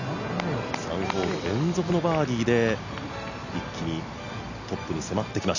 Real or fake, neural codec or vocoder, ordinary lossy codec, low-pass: real; none; none; 7.2 kHz